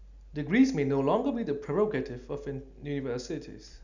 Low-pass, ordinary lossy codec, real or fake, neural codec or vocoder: 7.2 kHz; none; real; none